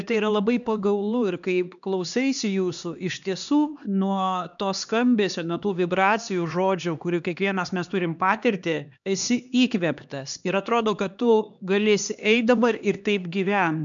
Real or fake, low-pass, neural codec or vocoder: fake; 7.2 kHz; codec, 16 kHz, 2 kbps, X-Codec, HuBERT features, trained on LibriSpeech